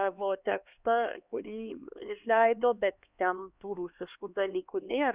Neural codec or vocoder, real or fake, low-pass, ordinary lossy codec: codec, 16 kHz, 2 kbps, X-Codec, HuBERT features, trained on LibriSpeech; fake; 3.6 kHz; Opus, 64 kbps